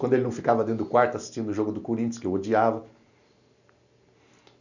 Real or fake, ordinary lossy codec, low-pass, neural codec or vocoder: real; none; 7.2 kHz; none